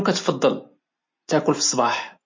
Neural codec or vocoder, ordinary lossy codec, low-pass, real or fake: none; MP3, 32 kbps; 7.2 kHz; real